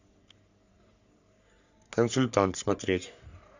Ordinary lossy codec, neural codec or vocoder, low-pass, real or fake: none; codec, 44.1 kHz, 3.4 kbps, Pupu-Codec; 7.2 kHz; fake